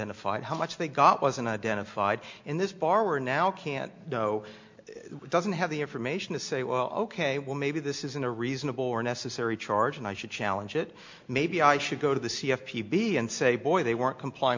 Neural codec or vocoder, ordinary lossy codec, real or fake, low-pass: none; MP3, 48 kbps; real; 7.2 kHz